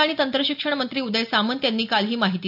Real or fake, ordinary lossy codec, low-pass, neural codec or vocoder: real; none; 5.4 kHz; none